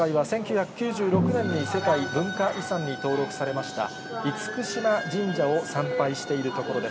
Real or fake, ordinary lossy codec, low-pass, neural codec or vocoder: real; none; none; none